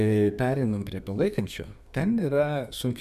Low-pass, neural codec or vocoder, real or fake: 14.4 kHz; codec, 32 kHz, 1.9 kbps, SNAC; fake